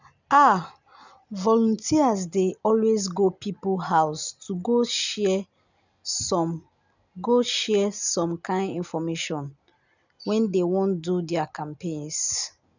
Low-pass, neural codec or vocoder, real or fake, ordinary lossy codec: 7.2 kHz; none; real; none